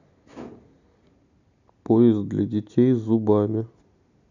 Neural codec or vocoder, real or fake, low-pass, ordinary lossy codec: none; real; 7.2 kHz; none